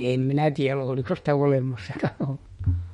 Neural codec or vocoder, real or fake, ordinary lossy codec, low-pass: autoencoder, 48 kHz, 32 numbers a frame, DAC-VAE, trained on Japanese speech; fake; MP3, 48 kbps; 19.8 kHz